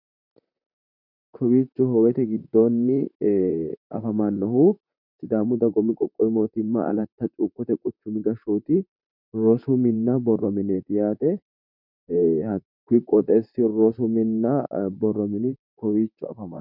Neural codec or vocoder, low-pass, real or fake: vocoder, 44.1 kHz, 128 mel bands, Pupu-Vocoder; 5.4 kHz; fake